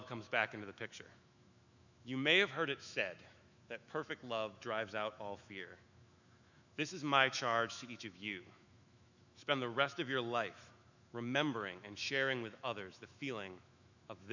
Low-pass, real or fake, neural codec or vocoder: 7.2 kHz; fake; autoencoder, 48 kHz, 128 numbers a frame, DAC-VAE, trained on Japanese speech